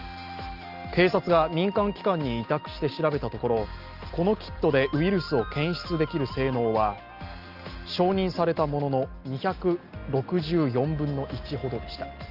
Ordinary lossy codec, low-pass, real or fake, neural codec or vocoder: Opus, 24 kbps; 5.4 kHz; real; none